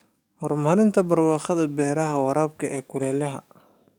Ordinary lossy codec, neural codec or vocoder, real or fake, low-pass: none; codec, 44.1 kHz, 7.8 kbps, DAC; fake; 19.8 kHz